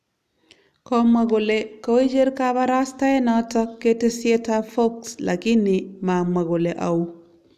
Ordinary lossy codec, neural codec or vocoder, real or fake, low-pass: none; none; real; 14.4 kHz